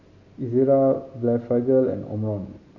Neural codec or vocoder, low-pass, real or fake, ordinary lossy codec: none; 7.2 kHz; real; none